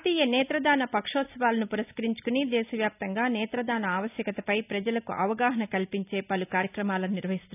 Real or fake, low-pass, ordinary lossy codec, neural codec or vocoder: real; 3.6 kHz; none; none